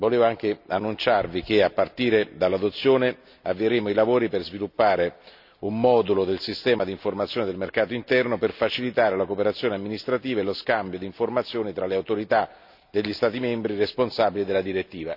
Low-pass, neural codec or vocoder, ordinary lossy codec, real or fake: 5.4 kHz; none; none; real